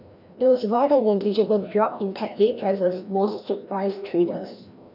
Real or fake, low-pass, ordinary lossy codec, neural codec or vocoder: fake; 5.4 kHz; none; codec, 16 kHz, 1 kbps, FreqCodec, larger model